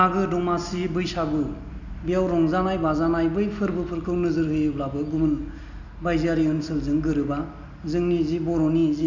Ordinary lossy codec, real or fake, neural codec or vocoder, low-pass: none; real; none; 7.2 kHz